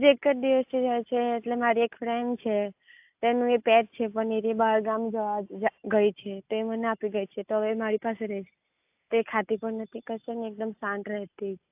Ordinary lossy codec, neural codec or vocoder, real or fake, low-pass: AAC, 32 kbps; none; real; 3.6 kHz